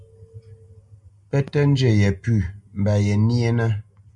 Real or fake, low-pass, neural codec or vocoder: real; 10.8 kHz; none